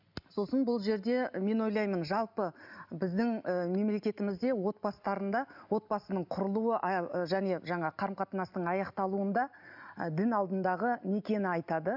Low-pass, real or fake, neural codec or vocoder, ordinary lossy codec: 5.4 kHz; real; none; none